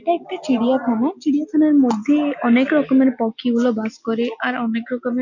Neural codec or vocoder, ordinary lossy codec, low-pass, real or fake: none; none; 7.2 kHz; real